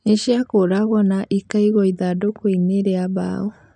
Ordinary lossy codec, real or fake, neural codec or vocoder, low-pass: none; real; none; 9.9 kHz